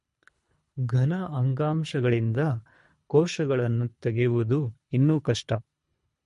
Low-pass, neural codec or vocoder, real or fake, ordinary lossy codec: 10.8 kHz; codec, 24 kHz, 3 kbps, HILCodec; fake; MP3, 48 kbps